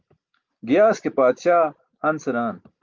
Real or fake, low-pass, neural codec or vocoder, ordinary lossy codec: real; 7.2 kHz; none; Opus, 24 kbps